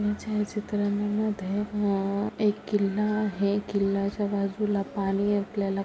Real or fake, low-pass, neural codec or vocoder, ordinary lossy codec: real; none; none; none